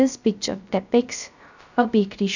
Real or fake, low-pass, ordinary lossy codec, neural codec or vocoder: fake; 7.2 kHz; none; codec, 16 kHz, 0.3 kbps, FocalCodec